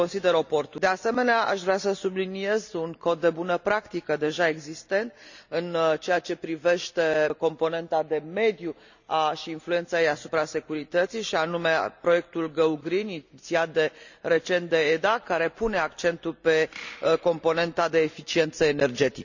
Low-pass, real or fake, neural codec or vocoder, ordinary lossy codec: 7.2 kHz; real; none; none